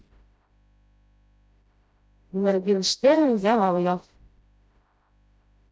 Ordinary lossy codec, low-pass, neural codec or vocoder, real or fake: none; none; codec, 16 kHz, 0.5 kbps, FreqCodec, smaller model; fake